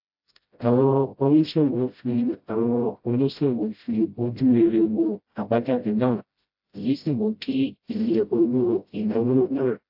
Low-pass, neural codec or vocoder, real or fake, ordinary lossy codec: 5.4 kHz; codec, 16 kHz, 0.5 kbps, FreqCodec, smaller model; fake; AAC, 48 kbps